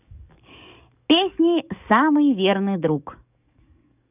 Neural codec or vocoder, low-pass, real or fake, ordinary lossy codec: codec, 44.1 kHz, 7.8 kbps, DAC; 3.6 kHz; fake; none